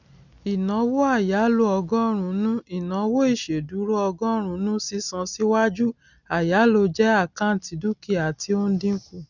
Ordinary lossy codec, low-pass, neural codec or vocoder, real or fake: none; 7.2 kHz; none; real